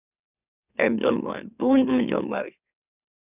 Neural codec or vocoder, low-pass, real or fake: autoencoder, 44.1 kHz, a latent of 192 numbers a frame, MeloTTS; 3.6 kHz; fake